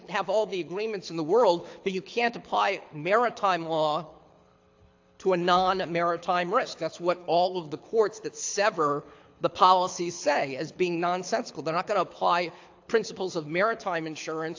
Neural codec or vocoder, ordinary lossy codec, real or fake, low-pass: codec, 24 kHz, 6 kbps, HILCodec; AAC, 48 kbps; fake; 7.2 kHz